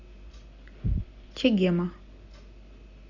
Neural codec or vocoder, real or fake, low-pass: none; real; 7.2 kHz